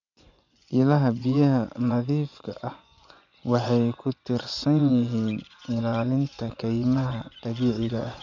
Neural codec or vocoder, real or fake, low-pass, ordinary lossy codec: vocoder, 24 kHz, 100 mel bands, Vocos; fake; 7.2 kHz; none